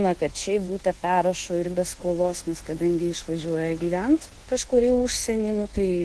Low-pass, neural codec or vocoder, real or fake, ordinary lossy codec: 10.8 kHz; codec, 24 kHz, 1.2 kbps, DualCodec; fake; Opus, 16 kbps